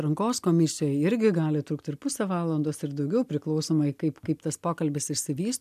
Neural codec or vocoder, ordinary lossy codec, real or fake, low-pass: none; MP3, 96 kbps; real; 14.4 kHz